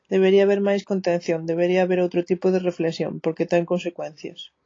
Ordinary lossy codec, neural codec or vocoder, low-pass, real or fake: AAC, 32 kbps; none; 7.2 kHz; real